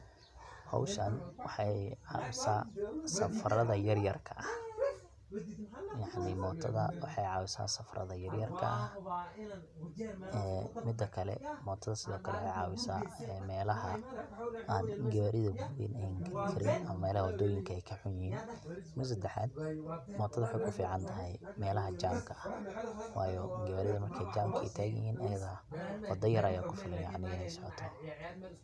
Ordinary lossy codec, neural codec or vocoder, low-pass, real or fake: none; none; none; real